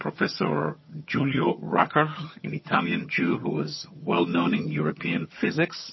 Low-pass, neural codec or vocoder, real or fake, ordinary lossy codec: 7.2 kHz; vocoder, 22.05 kHz, 80 mel bands, HiFi-GAN; fake; MP3, 24 kbps